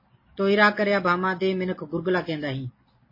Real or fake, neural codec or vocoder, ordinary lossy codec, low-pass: real; none; MP3, 24 kbps; 5.4 kHz